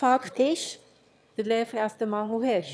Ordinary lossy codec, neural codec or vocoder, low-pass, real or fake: none; autoencoder, 22.05 kHz, a latent of 192 numbers a frame, VITS, trained on one speaker; none; fake